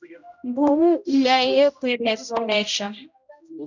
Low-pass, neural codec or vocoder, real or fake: 7.2 kHz; codec, 16 kHz, 0.5 kbps, X-Codec, HuBERT features, trained on general audio; fake